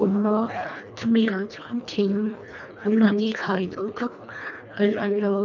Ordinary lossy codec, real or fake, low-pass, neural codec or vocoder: none; fake; 7.2 kHz; codec, 24 kHz, 1.5 kbps, HILCodec